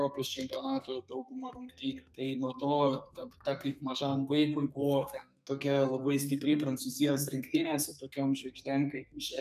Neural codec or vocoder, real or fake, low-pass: codec, 32 kHz, 1.9 kbps, SNAC; fake; 14.4 kHz